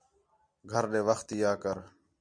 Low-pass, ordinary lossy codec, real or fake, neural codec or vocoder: 9.9 kHz; MP3, 64 kbps; real; none